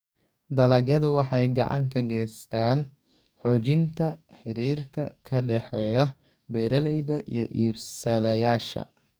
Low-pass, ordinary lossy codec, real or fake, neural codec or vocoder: none; none; fake; codec, 44.1 kHz, 2.6 kbps, DAC